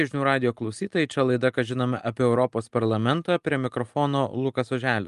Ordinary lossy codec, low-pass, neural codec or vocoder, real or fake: Opus, 32 kbps; 10.8 kHz; none; real